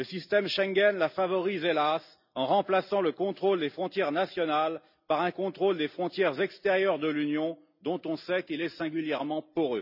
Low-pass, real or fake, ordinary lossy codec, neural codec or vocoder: 5.4 kHz; real; none; none